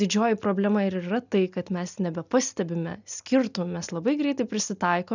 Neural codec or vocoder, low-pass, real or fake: none; 7.2 kHz; real